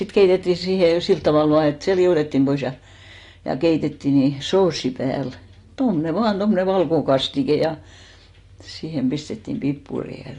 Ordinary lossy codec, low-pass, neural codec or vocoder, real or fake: AAC, 48 kbps; 10.8 kHz; none; real